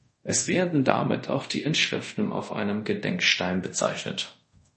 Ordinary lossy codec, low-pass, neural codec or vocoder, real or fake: MP3, 32 kbps; 10.8 kHz; codec, 24 kHz, 0.5 kbps, DualCodec; fake